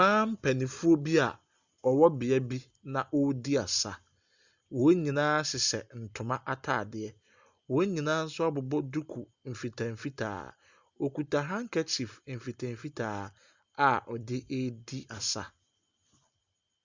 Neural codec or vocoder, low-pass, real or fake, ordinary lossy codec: none; 7.2 kHz; real; Opus, 64 kbps